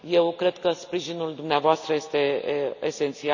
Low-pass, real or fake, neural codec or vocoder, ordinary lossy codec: 7.2 kHz; real; none; none